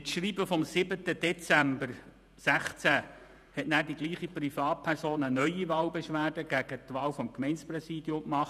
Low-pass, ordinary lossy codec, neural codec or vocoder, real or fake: 14.4 kHz; none; vocoder, 48 kHz, 128 mel bands, Vocos; fake